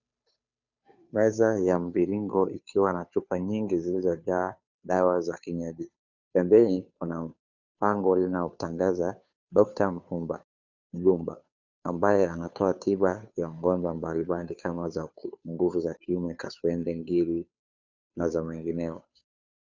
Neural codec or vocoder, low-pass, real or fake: codec, 16 kHz, 2 kbps, FunCodec, trained on Chinese and English, 25 frames a second; 7.2 kHz; fake